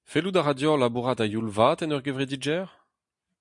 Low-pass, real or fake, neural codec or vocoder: 10.8 kHz; real; none